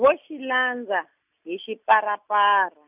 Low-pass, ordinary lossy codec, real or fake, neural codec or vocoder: 3.6 kHz; none; real; none